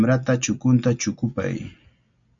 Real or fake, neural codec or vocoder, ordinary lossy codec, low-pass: real; none; MP3, 48 kbps; 7.2 kHz